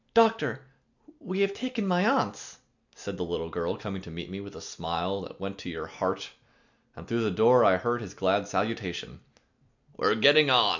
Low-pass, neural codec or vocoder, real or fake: 7.2 kHz; none; real